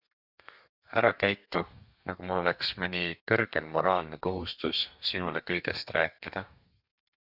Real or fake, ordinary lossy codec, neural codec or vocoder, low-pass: fake; Opus, 64 kbps; codec, 32 kHz, 1.9 kbps, SNAC; 5.4 kHz